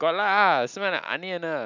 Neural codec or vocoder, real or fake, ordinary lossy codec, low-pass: none; real; none; 7.2 kHz